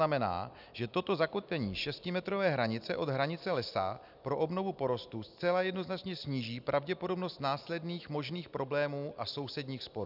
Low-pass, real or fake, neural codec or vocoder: 5.4 kHz; real; none